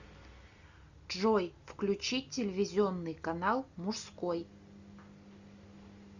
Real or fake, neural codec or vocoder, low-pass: real; none; 7.2 kHz